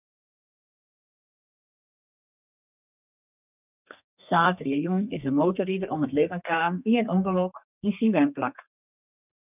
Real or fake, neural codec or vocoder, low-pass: fake; codec, 32 kHz, 1.9 kbps, SNAC; 3.6 kHz